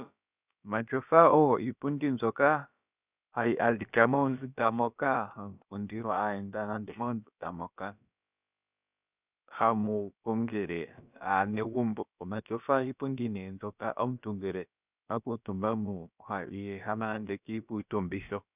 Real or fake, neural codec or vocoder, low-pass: fake; codec, 16 kHz, about 1 kbps, DyCAST, with the encoder's durations; 3.6 kHz